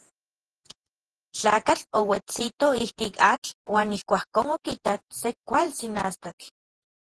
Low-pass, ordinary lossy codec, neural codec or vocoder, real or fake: 10.8 kHz; Opus, 16 kbps; vocoder, 48 kHz, 128 mel bands, Vocos; fake